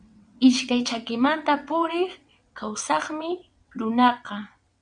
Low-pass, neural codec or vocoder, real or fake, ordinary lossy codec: 9.9 kHz; vocoder, 22.05 kHz, 80 mel bands, WaveNeXt; fake; MP3, 96 kbps